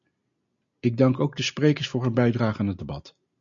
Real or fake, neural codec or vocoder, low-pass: real; none; 7.2 kHz